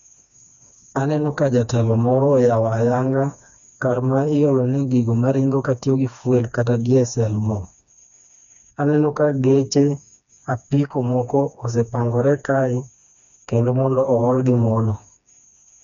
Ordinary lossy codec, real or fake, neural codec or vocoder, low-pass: none; fake; codec, 16 kHz, 2 kbps, FreqCodec, smaller model; 7.2 kHz